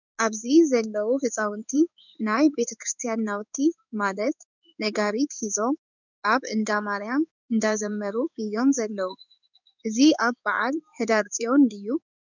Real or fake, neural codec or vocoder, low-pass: fake; codec, 16 kHz in and 24 kHz out, 1 kbps, XY-Tokenizer; 7.2 kHz